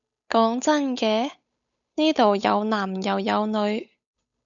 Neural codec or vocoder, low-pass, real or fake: codec, 16 kHz, 8 kbps, FunCodec, trained on Chinese and English, 25 frames a second; 7.2 kHz; fake